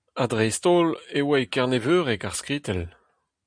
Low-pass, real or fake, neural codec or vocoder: 9.9 kHz; real; none